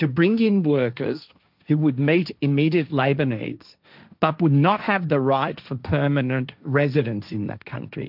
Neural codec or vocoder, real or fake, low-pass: codec, 16 kHz, 1.1 kbps, Voila-Tokenizer; fake; 5.4 kHz